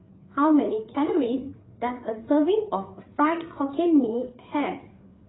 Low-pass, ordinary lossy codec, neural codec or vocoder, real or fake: 7.2 kHz; AAC, 16 kbps; codec, 16 kHz, 4 kbps, FreqCodec, larger model; fake